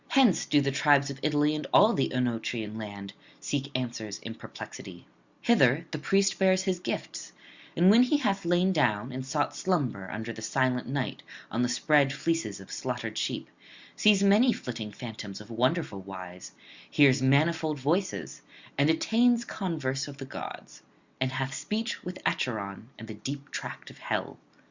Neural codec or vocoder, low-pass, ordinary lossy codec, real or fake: none; 7.2 kHz; Opus, 64 kbps; real